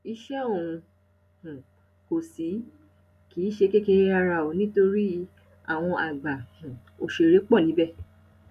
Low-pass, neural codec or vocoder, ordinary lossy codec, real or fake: 14.4 kHz; none; none; real